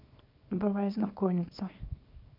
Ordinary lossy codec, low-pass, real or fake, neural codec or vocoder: none; 5.4 kHz; fake; codec, 24 kHz, 0.9 kbps, WavTokenizer, small release